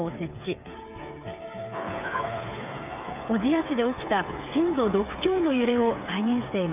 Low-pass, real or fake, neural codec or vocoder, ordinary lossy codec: 3.6 kHz; fake; codec, 16 kHz, 8 kbps, FreqCodec, smaller model; none